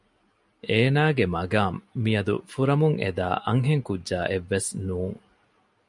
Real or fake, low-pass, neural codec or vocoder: real; 10.8 kHz; none